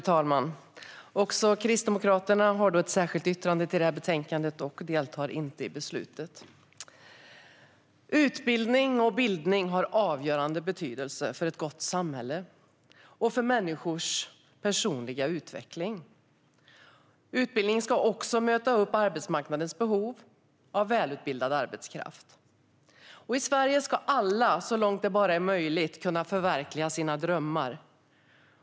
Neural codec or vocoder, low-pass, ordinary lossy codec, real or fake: none; none; none; real